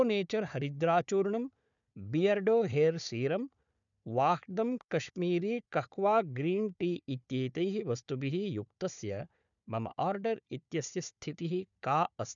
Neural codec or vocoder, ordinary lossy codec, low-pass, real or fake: codec, 16 kHz, 4 kbps, FunCodec, trained on Chinese and English, 50 frames a second; none; 7.2 kHz; fake